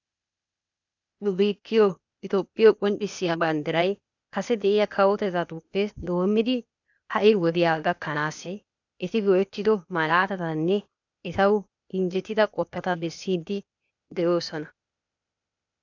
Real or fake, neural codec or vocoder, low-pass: fake; codec, 16 kHz, 0.8 kbps, ZipCodec; 7.2 kHz